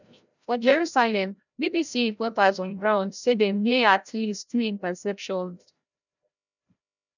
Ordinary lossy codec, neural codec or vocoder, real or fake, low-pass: none; codec, 16 kHz, 0.5 kbps, FreqCodec, larger model; fake; 7.2 kHz